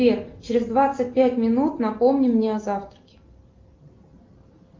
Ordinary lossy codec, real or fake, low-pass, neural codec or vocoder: Opus, 32 kbps; real; 7.2 kHz; none